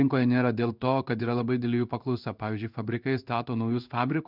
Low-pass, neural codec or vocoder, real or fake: 5.4 kHz; codec, 16 kHz in and 24 kHz out, 1 kbps, XY-Tokenizer; fake